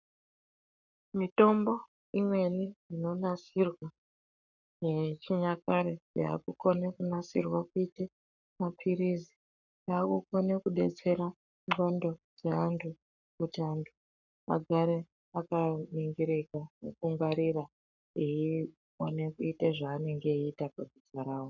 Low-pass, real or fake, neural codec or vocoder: 7.2 kHz; fake; codec, 44.1 kHz, 7.8 kbps, Pupu-Codec